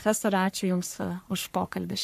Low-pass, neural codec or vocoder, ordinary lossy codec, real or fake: 14.4 kHz; codec, 44.1 kHz, 3.4 kbps, Pupu-Codec; MP3, 64 kbps; fake